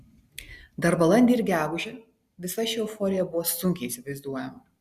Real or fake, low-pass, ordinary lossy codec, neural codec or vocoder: real; 14.4 kHz; Opus, 64 kbps; none